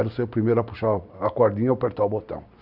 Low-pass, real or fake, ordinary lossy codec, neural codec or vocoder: 5.4 kHz; real; none; none